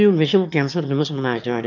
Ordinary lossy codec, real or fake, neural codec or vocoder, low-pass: none; fake; autoencoder, 22.05 kHz, a latent of 192 numbers a frame, VITS, trained on one speaker; 7.2 kHz